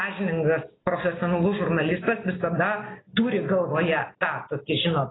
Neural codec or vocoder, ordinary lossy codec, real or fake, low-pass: none; AAC, 16 kbps; real; 7.2 kHz